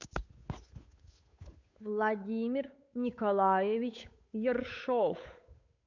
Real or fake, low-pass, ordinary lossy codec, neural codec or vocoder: fake; 7.2 kHz; AAC, 48 kbps; codec, 16 kHz, 8 kbps, FunCodec, trained on Chinese and English, 25 frames a second